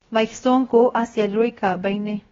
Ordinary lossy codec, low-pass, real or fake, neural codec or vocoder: AAC, 24 kbps; 7.2 kHz; fake; codec, 16 kHz, 0.7 kbps, FocalCodec